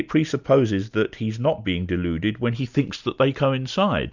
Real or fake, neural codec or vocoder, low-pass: real; none; 7.2 kHz